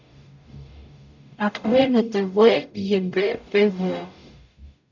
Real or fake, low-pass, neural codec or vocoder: fake; 7.2 kHz; codec, 44.1 kHz, 0.9 kbps, DAC